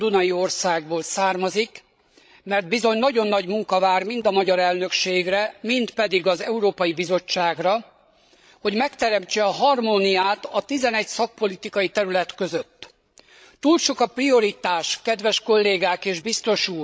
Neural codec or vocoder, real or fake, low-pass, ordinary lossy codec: codec, 16 kHz, 16 kbps, FreqCodec, larger model; fake; none; none